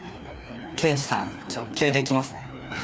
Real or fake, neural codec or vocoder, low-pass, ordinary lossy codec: fake; codec, 16 kHz, 2 kbps, FreqCodec, larger model; none; none